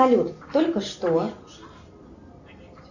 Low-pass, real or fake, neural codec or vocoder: 7.2 kHz; real; none